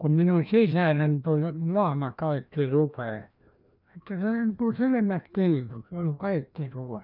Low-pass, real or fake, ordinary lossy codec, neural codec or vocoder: 5.4 kHz; fake; none; codec, 16 kHz, 1 kbps, FreqCodec, larger model